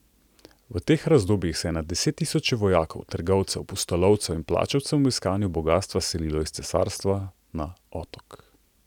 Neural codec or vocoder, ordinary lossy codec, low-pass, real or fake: none; none; 19.8 kHz; real